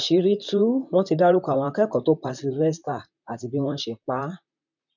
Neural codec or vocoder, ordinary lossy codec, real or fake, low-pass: vocoder, 44.1 kHz, 128 mel bands, Pupu-Vocoder; none; fake; 7.2 kHz